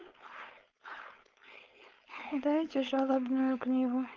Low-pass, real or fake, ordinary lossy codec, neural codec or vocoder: 7.2 kHz; fake; Opus, 24 kbps; codec, 16 kHz, 4.8 kbps, FACodec